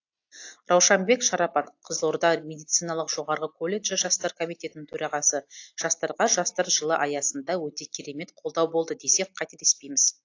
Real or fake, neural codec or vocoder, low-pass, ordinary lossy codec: real; none; 7.2 kHz; AAC, 48 kbps